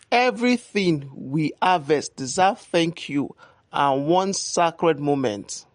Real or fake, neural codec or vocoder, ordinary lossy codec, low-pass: real; none; MP3, 48 kbps; 9.9 kHz